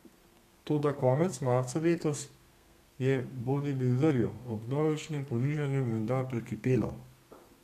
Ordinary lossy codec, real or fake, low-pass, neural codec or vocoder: none; fake; 14.4 kHz; codec, 32 kHz, 1.9 kbps, SNAC